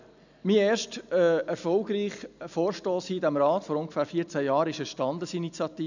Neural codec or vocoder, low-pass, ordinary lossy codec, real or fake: none; 7.2 kHz; none; real